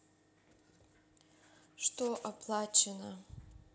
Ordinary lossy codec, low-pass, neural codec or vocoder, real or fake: none; none; none; real